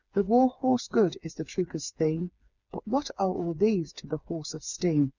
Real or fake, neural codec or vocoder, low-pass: fake; codec, 16 kHz, 4 kbps, FreqCodec, smaller model; 7.2 kHz